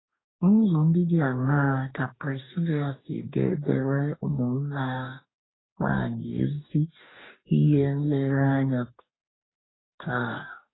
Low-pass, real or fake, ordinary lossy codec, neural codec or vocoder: 7.2 kHz; fake; AAC, 16 kbps; codec, 44.1 kHz, 2.6 kbps, DAC